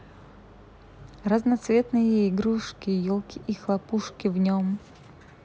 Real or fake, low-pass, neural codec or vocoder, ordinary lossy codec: real; none; none; none